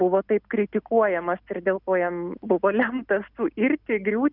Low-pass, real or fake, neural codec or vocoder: 5.4 kHz; real; none